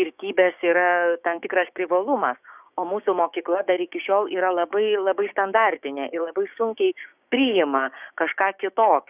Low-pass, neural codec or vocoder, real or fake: 3.6 kHz; codec, 16 kHz, 6 kbps, DAC; fake